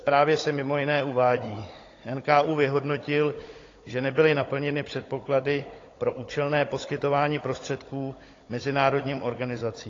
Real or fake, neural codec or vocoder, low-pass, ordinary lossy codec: fake; codec, 16 kHz, 16 kbps, FunCodec, trained on Chinese and English, 50 frames a second; 7.2 kHz; AAC, 32 kbps